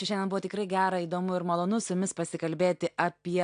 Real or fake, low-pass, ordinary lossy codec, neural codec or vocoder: real; 9.9 kHz; AAC, 64 kbps; none